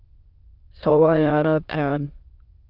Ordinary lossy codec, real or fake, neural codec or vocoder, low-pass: Opus, 24 kbps; fake; autoencoder, 22.05 kHz, a latent of 192 numbers a frame, VITS, trained on many speakers; 5.4 kHz